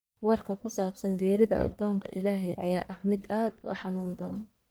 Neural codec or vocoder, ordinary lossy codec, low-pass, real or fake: codec, 44.1 kHz, 1.7 kbps, Pupu-Codec; none; none; fake